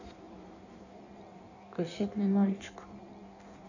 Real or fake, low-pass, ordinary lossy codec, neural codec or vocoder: fake; 7.2 kHz; none; codec, 16 kHz in and 24 kHz out, 1.1 kbps, FireRedTTS-2 codec